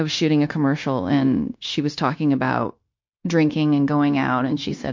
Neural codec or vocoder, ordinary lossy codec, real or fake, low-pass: codec, 16 kHz, 0.9 kbps, LongCat-Audio-Codec; MP3, 48 kbps; fake; 7.2 kHz